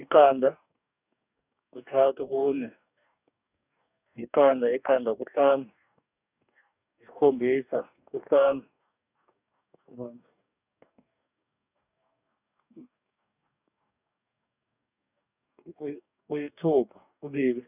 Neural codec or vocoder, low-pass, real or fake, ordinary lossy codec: codec, 44.1 kHz, 2.6 kbps, DAC; 3.6 kHz; fake; none